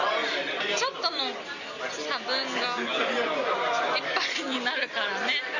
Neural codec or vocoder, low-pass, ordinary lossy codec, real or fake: none; 7.2 kHz; none; real